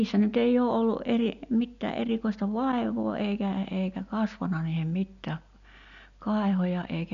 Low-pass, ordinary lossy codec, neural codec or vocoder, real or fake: 7.2 kHz; none; none; real